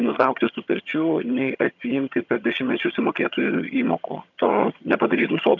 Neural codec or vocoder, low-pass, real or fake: vocoder, 22.05 kHz, 80 mel bands, HiFi-GAN; 7.2 kHz; fake